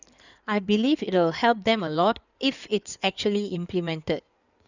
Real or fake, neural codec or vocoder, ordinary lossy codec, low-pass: fake; codec, 16 kHz in and 24 kHz out, 2.2 kbps, FireRedTTS-2 codec; none; 7.2 kHz